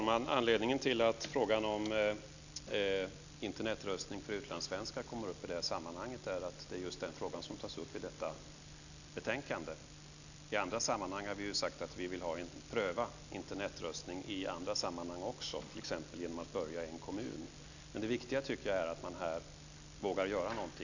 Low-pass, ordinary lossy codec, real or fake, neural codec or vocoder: 7.2 kHz; none; real; none